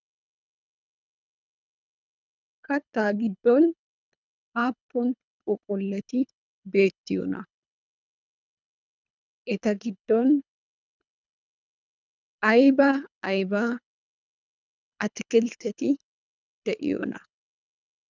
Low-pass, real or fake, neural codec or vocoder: 7.2 kHz; fake; codec, 24 kHz, 3 kbps, HILCodec